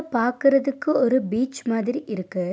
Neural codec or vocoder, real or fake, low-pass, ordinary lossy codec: none; real; none; none